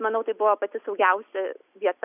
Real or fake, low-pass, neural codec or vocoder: real; 3.6 kHz; none